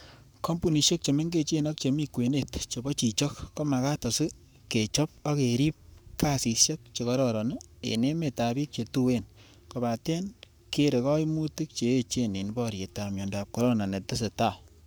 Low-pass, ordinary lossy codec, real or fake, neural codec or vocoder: none; none; fake; codec, 44.1 kHz, 7.8 kbps, Pupu-Codec